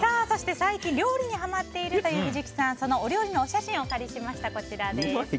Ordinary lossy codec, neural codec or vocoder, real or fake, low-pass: none; none; real; none